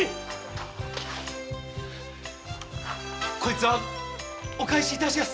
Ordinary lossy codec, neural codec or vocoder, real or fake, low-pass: none; none; real; none